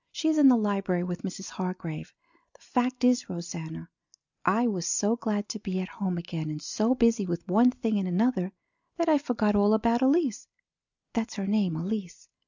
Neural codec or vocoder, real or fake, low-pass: none; real; 7.2 kHz